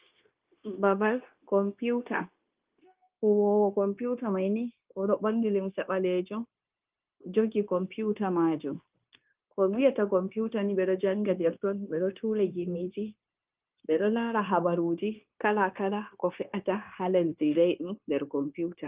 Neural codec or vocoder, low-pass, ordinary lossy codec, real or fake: codec, 16 kHz, 0.9 kbps, LongCat-Audio-Codec; 3.6 kHz; Opus, 24 kbps; fake